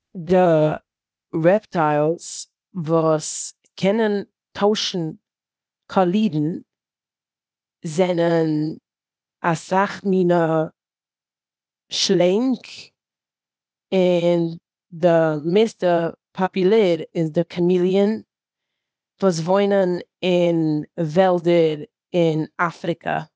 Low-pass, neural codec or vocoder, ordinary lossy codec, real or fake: none; codec, 16 kHz, 0.8 kbps, ZipCodec; none; fake